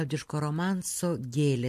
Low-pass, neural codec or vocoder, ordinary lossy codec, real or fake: 14.4 kHz; none; MP3, 64 kbps; real